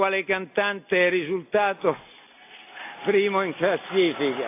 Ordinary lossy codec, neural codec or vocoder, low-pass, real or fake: AAC, 24 kbps; none; 3.6 kHz; real